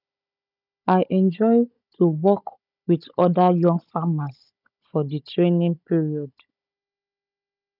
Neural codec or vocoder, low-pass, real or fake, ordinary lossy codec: codec, 16 kHz, 16 kbps, FunCodec, trained on Chinese and English, 50 frames a second; 5.4 kHz; fake; none